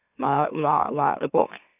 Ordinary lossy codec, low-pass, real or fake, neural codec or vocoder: none; 3.6 kHz; fake; autoencoder, 44.1 kHz, a latent of 192 numbers a frame, MeloTTS